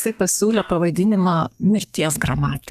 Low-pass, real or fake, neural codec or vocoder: 14.4 kHz; fake; codec, 32 kHz, 1.9 kbps, SNAC